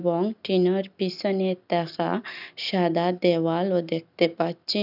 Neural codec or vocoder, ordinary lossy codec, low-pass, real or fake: none; none; 5.4 kHz; real